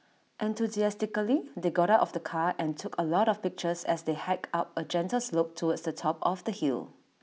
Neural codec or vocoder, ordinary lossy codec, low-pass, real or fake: none; none; none; real